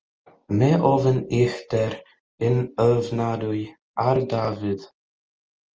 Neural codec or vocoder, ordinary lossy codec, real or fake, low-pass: none; Opus, 24 kbps; real; 7.2 kHz